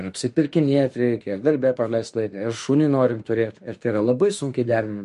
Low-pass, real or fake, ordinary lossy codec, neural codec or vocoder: 14.4 kHz; fake; MP3, 48 kbps; autoencoder, 48 kHz, 32 numbers a frame, DAC-VAE, trained on Japanese speech